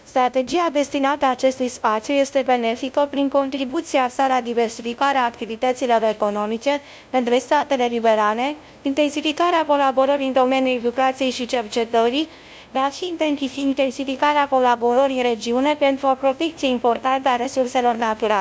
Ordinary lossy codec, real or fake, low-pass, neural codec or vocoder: none; fake; none; codec, 16 kHz, 0.5 kbps, FunCodec, trained on LibriTTS, 25 frames a second